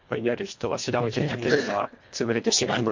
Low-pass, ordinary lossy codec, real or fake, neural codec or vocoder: 7.2 kHz; MP3, 48 kbps; fake; codec, 24 kHz, 1.5 kbps, HILCodec